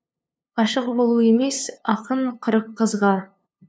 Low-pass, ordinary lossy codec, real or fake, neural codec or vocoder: none; none; fake; codec, 16 kHz, 2 kbps, FunCodec, trained on LibriTTS, 25 frames a second